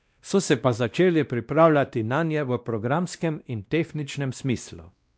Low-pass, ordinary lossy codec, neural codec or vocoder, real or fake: none; none; codec, 16 kHz, 1 kbps, X-Codec, WavLM features, trained on Multilingual LibriSpeech; fake